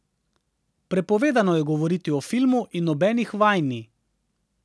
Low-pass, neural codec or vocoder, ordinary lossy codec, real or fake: none; none; none; real